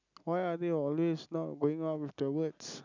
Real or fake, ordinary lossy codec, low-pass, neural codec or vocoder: real; none; 7.2 kHz; none